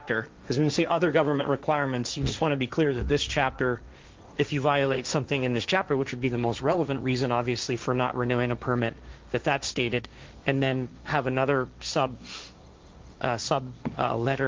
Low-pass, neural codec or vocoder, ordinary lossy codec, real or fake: 7.2 kHz; codec, 16 kHz, 1.1 kbps, Voila-Tokenizer; Opus, 24 kbps; fake